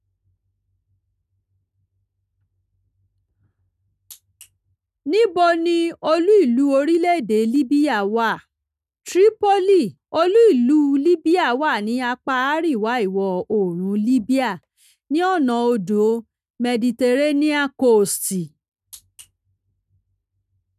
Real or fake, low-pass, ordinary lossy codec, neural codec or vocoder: real; 14.4 kHz; none; none